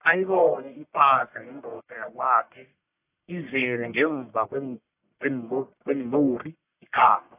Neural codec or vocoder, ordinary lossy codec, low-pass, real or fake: codec, 44.1 kHz, 1.7 kbps, Pupu-Codec; AAC, 32 kbps; 3.6 kHz; fake